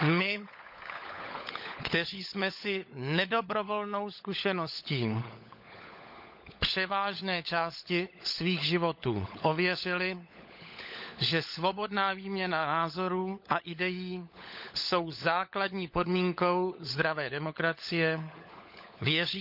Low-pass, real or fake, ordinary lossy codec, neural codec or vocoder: 5.4 kHz; fake; none; codec, 16 kHz, 16 kbps, FunCodec, trained on LibriTTS, 50 frames a second